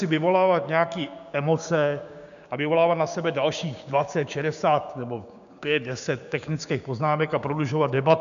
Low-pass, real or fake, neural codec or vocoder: 7.2 kHz; fake; codec, 16 kHz, 6 kbps, DAC